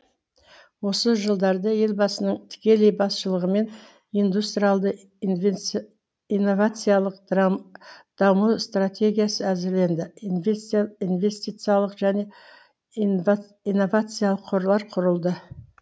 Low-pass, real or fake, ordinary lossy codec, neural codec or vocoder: none; real; none; none